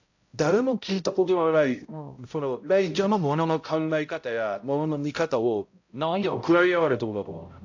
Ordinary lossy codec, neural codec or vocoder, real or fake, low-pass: AAC, 48 kbps; codec, 16 kHz, 0.5 kbps, X-Codec, HuBERT features, trained on balanced general audio; fake; 7.2 kHz